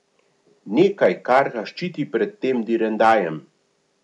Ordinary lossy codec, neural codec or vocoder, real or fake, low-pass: none; none; real; 10.8 kHz